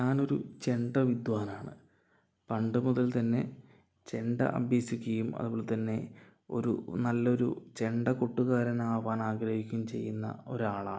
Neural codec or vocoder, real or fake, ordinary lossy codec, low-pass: none; real; none; none